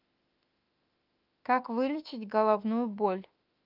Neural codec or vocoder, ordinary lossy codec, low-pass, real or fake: autoencoder, 48 kHz, 32 numbers a frame, DAC-VAE, trained on Japanese speech; Opus, 24 kbps; 5.4 kHz; fake